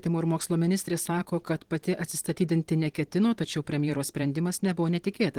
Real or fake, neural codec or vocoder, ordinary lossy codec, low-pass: fake; vocoder, 44.1 kHz, 128 mel bands every 512 samples, BigVGAN v2; Opus, 16 kbps; 19.8 kHz